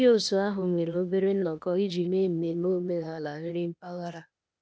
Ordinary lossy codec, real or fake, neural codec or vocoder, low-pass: none; fake; codec, 16 kHz, 0.8 kbps, ZipCodec; none